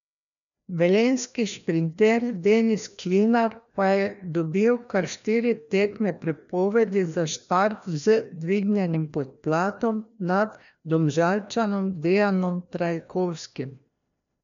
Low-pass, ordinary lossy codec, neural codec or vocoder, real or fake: 7.2 kHz; none; codec, 16 kHz, 1 kbps, FreqCodec, larger model; fake